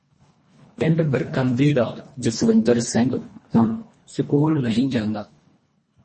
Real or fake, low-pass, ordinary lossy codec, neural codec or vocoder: fake; 10.8 kHz; MP3, 32 kbps; codec, 24 kHz, 1.5 kbps, HILCodec